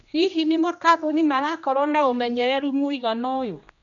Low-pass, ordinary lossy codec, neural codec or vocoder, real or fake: 7.2 kHz; none; codec, 16 kHz, 1 kbps, X-Codec, HuBERT features, trained on general audio; fake